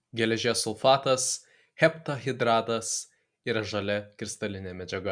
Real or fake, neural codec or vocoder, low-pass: real; none; 9.9 kHz